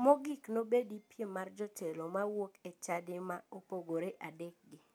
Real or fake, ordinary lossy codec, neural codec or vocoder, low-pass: real; none; none; none